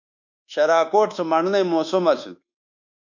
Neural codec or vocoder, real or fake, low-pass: codec, 24 kHz, 1.2 kbps, DualCodec; fake; 7.2 kHz